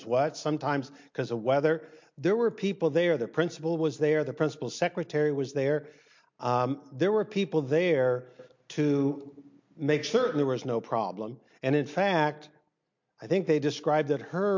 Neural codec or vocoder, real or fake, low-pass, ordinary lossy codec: none; real; 7.2 kHz; MP3, 48 kbps